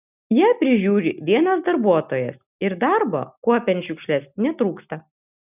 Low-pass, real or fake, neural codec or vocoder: 3.6 kHz; real; none